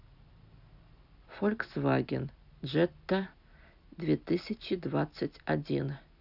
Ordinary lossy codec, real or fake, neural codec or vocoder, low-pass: none; real; none; 5.4 kHz